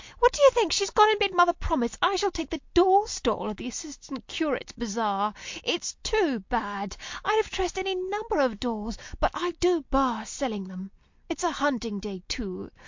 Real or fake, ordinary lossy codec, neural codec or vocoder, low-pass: real; MP3, 48 kbps; none; 7.2 kHz